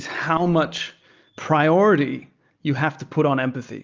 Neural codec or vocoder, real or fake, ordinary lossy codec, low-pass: none; real; Opus, 24 kbps; 7.2 kHz